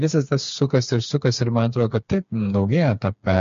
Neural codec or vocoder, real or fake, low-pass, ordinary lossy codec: codec, 16 kHz, 4 kbps, FreqCodec, smaller model; fake; 7.2 kHz; MP3, 48 kbps